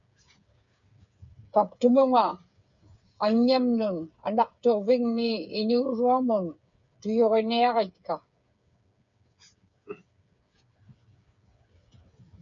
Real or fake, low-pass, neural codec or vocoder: fake; 7.2 kHz; codec, 16 kHz, 8 kbps, FreqCodec, smaller model